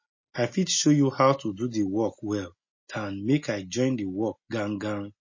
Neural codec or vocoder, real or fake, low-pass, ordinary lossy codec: none; real; 7.2 kHz; MP3, 32 kbps